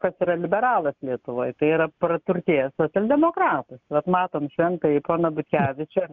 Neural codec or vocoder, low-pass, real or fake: none; 7.2 kHz; real